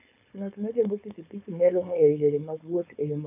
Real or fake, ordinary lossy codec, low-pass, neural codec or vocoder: fake; AAC, 32 kbps; 3.6 kHz; codec, 16 kHz, 4.8 kbps, FACodec